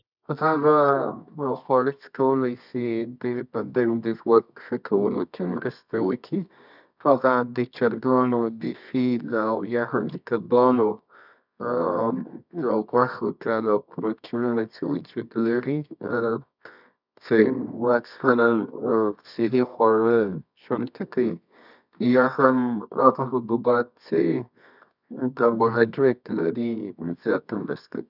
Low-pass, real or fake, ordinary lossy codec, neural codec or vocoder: 5.4 kHz; fake; none; codec, 24 kHz, 0.9 kbps, WavTokenizer, medium music audio release